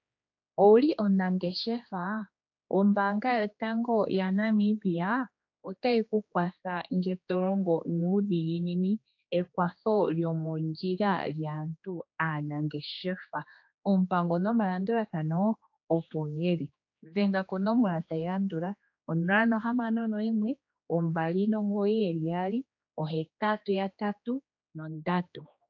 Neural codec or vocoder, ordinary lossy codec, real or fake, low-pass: codec, 16 kHz, 2 kbps, X-Codec, HuBERT features, trained on general audio; AAC, 48 kbps; fake; 7.2 kHz